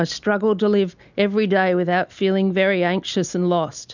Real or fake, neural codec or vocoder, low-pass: real; none; 7.2 kHz